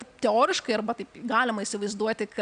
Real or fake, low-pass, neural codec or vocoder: real; 9.9 kHz; none